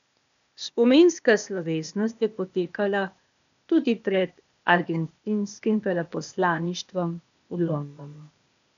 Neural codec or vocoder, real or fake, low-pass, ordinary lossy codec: codec, 16 kHz, 0.8 kbps, ZipCodec; fake; 7.2 kHz; none